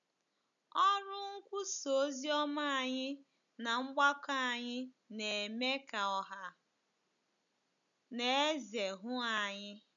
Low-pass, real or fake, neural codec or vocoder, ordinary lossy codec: 7.2 kHz; real; none; none